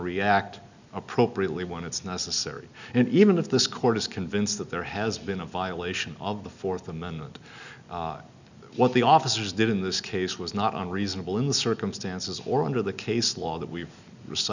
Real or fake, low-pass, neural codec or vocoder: real; 7.2 kHz; none